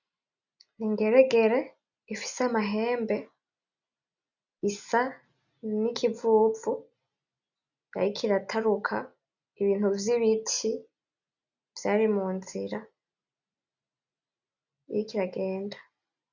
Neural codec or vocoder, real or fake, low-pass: none; real; 7.2 kHz